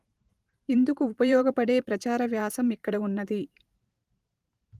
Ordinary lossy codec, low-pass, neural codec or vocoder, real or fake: Opus, 24 kbps; 14.4 kHz; vocoder, 44.1 kHz, 128 mel bands every 512 samples, BigVGAN v2; fake